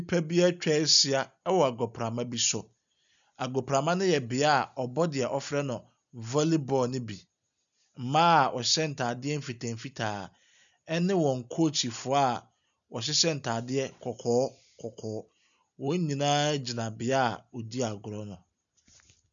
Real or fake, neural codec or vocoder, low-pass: real; none; 7.2 kHz